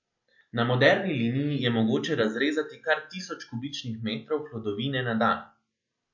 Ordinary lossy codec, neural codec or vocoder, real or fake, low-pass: MP3, 48 kbps; none; real; 7.2 kHz